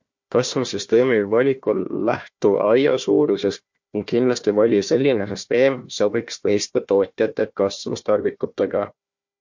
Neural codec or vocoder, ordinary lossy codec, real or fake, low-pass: codec, 16 kHz, 1 kbps, FunCodec, trained on Chinese and English, 50 frames a second; MP3, 48 kbps; fake; 7.2 kHz